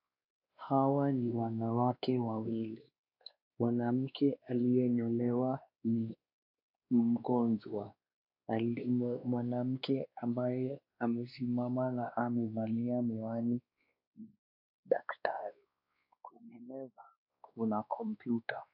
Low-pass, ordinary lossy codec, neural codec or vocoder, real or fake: 5.4 kHz; AAC, 48 kbps; codec, 16 kHz, 2 kbps, X-Codec, WavLM features, trained on Multilingual LibriSpeech; fake